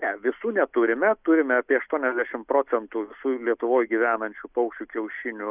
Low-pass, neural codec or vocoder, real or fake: 3.6 kHz; none; real